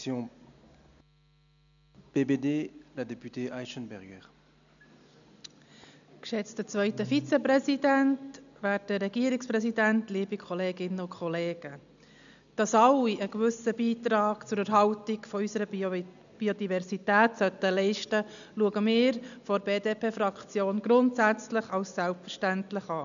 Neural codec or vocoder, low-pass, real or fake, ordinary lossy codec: none; 7.2 kHz; real; none